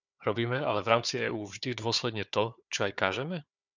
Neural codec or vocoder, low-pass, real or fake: codec, 16 kHz, 4 kbps, FunCodec, trained on Chinese and English, 50 frames a second; 7.2 kHz; fake